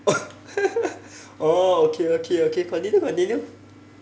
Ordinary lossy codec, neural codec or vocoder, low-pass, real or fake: none; none; none; real